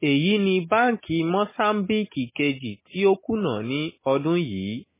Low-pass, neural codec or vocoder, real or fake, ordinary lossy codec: 3.6 kHz; none; real; MP3, 16 kbps